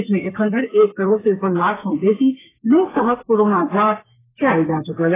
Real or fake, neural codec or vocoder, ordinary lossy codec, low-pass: fake; codec, 32 kHz, 1.9 kbps, SNAC; AAC, 16 kbps; 3.6 kHz